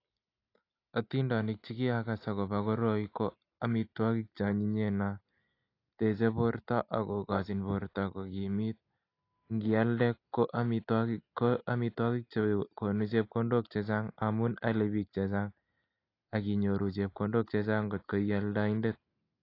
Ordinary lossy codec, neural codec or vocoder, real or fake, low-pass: AAC, 32 kbps; none; real; 5.4 kHz